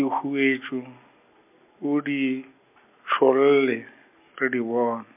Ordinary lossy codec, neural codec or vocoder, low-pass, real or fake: MP3, 32 kbps; none; 3.6 kHz; real